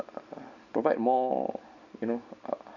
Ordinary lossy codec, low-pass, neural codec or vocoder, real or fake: none; 7.2 kHz; none; real